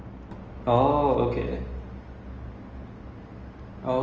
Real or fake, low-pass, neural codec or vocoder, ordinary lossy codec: real; 7.2 kHz; none; Opus, 24 kbps